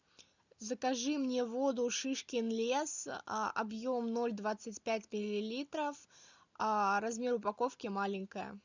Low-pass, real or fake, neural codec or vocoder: 7.2 kHz; real; none